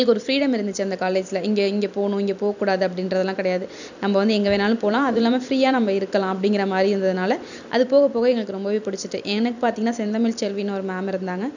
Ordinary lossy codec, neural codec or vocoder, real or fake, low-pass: none; vocoder, 44.1 kHz, 128 mel bands every 256 samples, BigVGAN v2; fake; 7.2 kHz